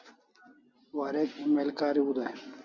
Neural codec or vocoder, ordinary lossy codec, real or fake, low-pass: none; Opus, 64 kbps; real; 7.2 kHz